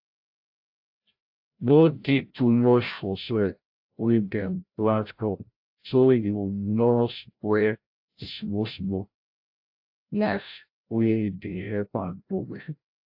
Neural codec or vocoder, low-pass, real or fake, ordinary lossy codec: codec, 16 kHz, 0.5 kbps, FreqCodec, larger model; 5.4 kHz; fake; AAC, 48 kbps